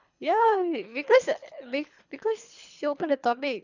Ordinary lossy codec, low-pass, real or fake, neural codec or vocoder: none; 7.2 kHz; fake; codec, 24 kHz, 3 kbps, HILCodec